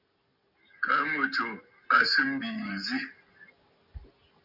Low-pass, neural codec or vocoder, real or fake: 5.4 kHz; none; real